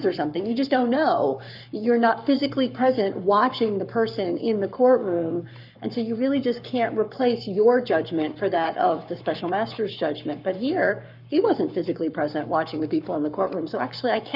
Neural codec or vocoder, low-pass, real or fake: codec, 44.1 kHz, 7.8 kbps, Pupu-Codec; 5.4 kHz; fake